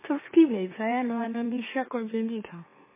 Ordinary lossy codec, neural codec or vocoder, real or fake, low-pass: MP3, 16 kbps; autoencoder, 44.1 kHz, a latent of 192 numbers a frame, MeloTTS; fake; 3.6 kHz